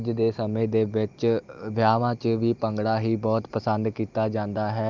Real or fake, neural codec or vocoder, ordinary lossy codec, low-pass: real; none; Opus, 24 kbps; 7.2 kHz